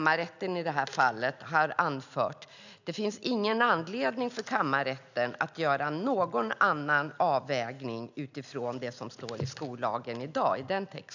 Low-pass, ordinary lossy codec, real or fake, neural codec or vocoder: 7.2 kHz; none; real; none